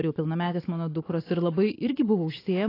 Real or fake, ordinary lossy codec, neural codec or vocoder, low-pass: real; AAC, 24 kbps; none; 5.4 kHz